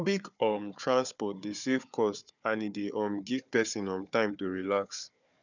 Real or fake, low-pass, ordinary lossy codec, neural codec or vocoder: fake; 7.2 kHz; none; codec, 16 kHz, 4 kbps, FunCodec, trained on Chinese and English, 50 frames a second